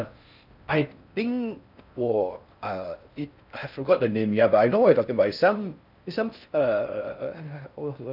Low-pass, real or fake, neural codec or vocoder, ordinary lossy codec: 5.4 kHz; fake; codec, 16 kHz in and 24 kHz out, 0.6 kbps, FocalCodec, streaming, 4096 codes; none